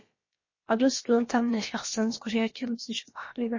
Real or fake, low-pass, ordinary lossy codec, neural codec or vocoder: fake; 7.2 kHz; MP3, 32 kbps; codec, 16 kHz, about 1 kbps, DyCAST, with the encoder's durations